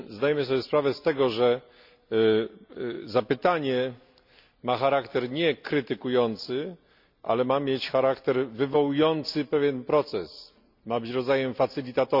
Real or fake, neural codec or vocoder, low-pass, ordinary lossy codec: real; none; 5.4 kHz; none